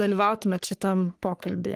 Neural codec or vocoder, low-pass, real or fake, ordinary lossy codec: codec, 44.1 kHz, 3.4 kbps, Pupu-Codec; 14.4 kHz; fake; Opus, 32 kbps